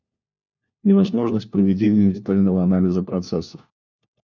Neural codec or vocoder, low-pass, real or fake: codec, 16 kHz, 1 kbps, FunCodec, trained on LibriTTS, 50 frames a second; 7.2 kHz; fake